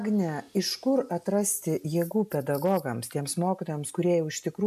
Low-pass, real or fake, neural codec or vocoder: 14.4 kHz; real; none